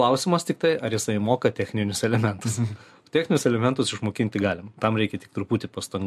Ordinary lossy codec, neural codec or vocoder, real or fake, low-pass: MP3, 64 kbps; none; real; 14.4 kHz